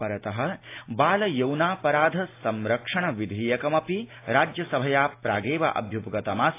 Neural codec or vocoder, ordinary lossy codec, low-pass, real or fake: none; AAC, 24 kbps; 3.6 kHz; real